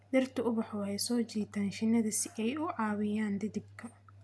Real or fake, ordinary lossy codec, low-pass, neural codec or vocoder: real; none; none; none